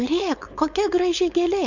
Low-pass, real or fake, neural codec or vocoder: 7.2 kHz; fake; codec, 16 kHz, 4.8 kbps, FACodec